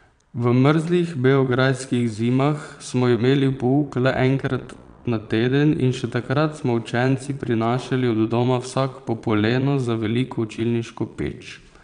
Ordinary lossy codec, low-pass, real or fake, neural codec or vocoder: none; 9.9 kHz; fake; vocoder, 22.05 kHz, 80 mel bands, Vocos